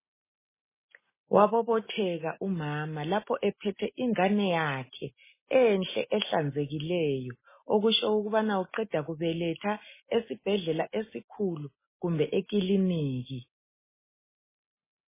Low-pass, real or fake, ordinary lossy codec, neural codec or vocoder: 3.6 kHz; real; MP3, 16 kbps; none